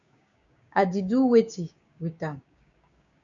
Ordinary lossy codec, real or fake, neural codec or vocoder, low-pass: MP3, 96 kbps; fake; codec, 16 kHz, 6 kbps, DAC; 7.2 kHz